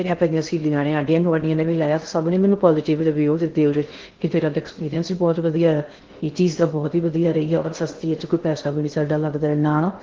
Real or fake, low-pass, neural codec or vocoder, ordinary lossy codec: fake; 7.2 kHz; codec, 16 kHz in and 24 kHz out, 0.6 kbps, FocalCodec, streaming, 2048 codes; Opus, 16 kbps